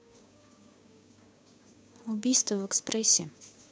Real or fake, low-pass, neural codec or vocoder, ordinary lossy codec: fake; none; codec, 16 kHz, 6 kbps, DAC; none